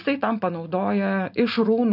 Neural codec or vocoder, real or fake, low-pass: none; real; 5.4 kHz